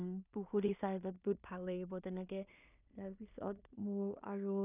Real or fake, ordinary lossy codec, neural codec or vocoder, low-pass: fake; none; codec, 16 kHz in and 24 kHz out, 0.4 kbps, LongCat-Audio-Codec, two codebook decoder; 3.6 kHz